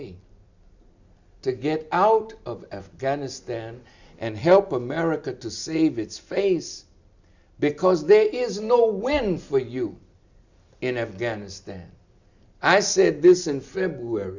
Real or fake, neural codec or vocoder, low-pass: real; none; 7.2 kHz